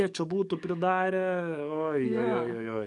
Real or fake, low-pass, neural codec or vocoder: fake; 10.8 kHz; codec, 44.1 kHz, 7.8 kbps, DAC